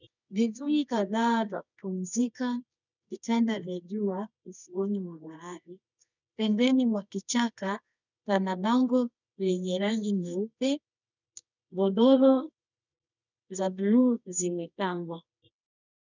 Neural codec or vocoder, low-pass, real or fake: codec, 24 kHz, 0.9 kbps, WavTokenizer, medium music audio release; 7.2 kHz; fake